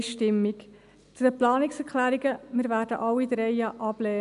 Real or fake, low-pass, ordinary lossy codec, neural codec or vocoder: real; 10.8 kHz; AAC, 96 kbps; none